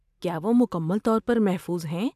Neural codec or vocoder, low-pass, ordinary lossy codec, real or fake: none; 14.4 kHz; AAC, 96 kbps; real